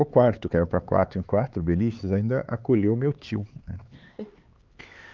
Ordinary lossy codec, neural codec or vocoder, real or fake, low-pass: Opus, 32 kbps; codec, 16 kHz, 4 kbps, X-Codec, HuBERT features, trained on LibriSpeech; fake; 7.2 kHz